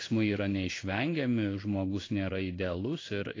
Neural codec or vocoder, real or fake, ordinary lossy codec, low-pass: codec, 16 kHz in and 24 kHz out, 1 kbps, XY-Tokenizer; fake; AAC, 32 kbps; 7.2 kHz